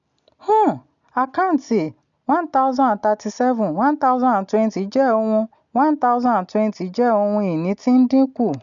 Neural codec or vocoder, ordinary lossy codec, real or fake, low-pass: none; MP3, 96 kbps; real; 7.2 kHz